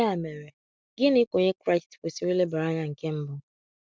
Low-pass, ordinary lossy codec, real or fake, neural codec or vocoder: none; none; real; none